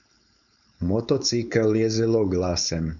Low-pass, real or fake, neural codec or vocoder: 7.2 kHz; fake; codec, 16 kHz, 4.8 kbps, FACodec